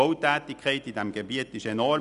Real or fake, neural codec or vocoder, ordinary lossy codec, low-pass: real; none; none; 10.8 kHz